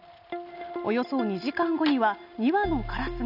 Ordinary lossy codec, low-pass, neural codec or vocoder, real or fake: none; 5.4 kHz; none; real